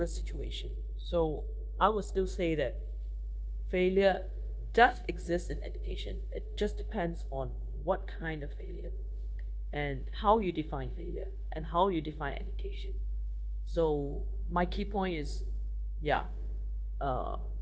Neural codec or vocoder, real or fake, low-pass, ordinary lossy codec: codec, 16 kHz, 0.9 kbps, LongCat-Audio-Codec; fake; none; none